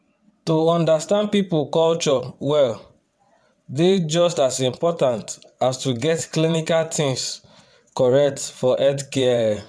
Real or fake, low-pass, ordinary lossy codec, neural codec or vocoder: fake; none; none; vocoder, 22.05 kHz, 80 mel bands, WaveNeXt